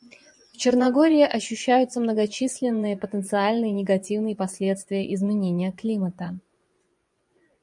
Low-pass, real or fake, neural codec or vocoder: 10.8 kHz; fake; vocoder, 24 kHz, 100 mel bands, Vocos